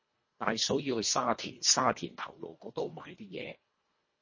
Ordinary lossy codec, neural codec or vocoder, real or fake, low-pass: MP3, 32 kbps; codec, 24 kHz, 1.5 kbps, HILCodec; fake; 7.2 kHz